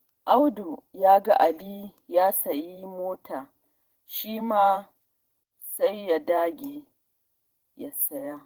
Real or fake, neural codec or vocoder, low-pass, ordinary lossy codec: fake; vocoder, 44.1 kHz, 128 mel bands every 512 samples, BigVGAN v2; 19.8 kHz; Opus, 16 kbps